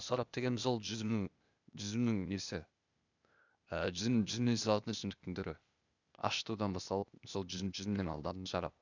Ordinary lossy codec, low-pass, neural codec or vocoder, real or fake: none; 7.2 kHz; codec, 16 kHz, 0.8 kbps, ZipCodec; fake